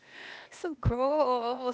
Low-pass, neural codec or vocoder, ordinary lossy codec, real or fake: none; codec, 16 kHz, 0.8 kbps, ZipCodec; none; fake